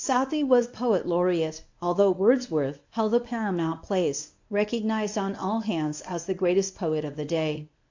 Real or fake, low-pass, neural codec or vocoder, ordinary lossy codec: fake; 7.2 kHz; codec, 24 kHz, 0.9 kbps, WavTokenizer, medium speech release version 1; AAC, 48 kbps